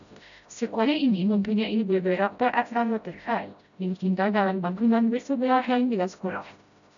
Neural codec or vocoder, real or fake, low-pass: codec, 16 kHz, 0.5 kbps, FreqCodec, smaller model; fake; 7.2 kHz